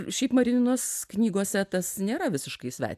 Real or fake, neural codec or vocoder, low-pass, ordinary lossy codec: real; none; 14.4 kHz; AAC, 96 kbps